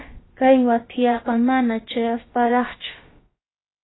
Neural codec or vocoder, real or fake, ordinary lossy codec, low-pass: codec, 16 kHz, about 1 kbps, DyCAST, with the encoder's durations; fake; AAC, 16 kbps; 7.2 kHz